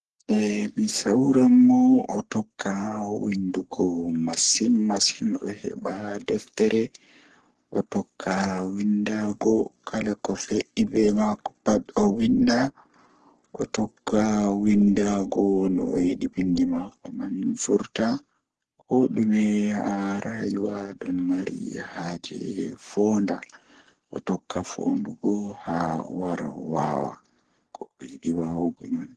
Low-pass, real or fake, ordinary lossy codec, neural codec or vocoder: 10.8 kHz; fake; Opus, 16 kbps; codec, 44.1 kHz, 3.4 kbps, Pupu-Codec